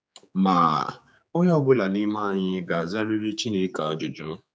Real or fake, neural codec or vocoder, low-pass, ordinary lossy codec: fake; codec, 16 kHz, 4 kbps, X-Codec, HuBERT features, trained on general audio; none; none